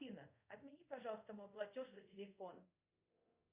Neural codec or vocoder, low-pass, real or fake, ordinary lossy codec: codec, 24 kHz, 0.5 kbps, DualCodec; 3.6 kHz; fake; Opus, 64 kbps